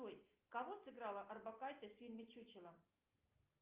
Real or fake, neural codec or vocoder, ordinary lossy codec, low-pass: real; none; Opus, 24 kbps; 3.6 kHz